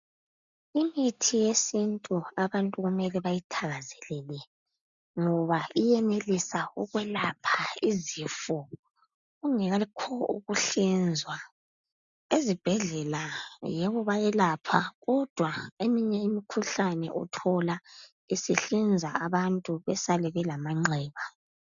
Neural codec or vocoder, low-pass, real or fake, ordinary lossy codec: none; 7.2 kHz; real; MP3, 96 kbps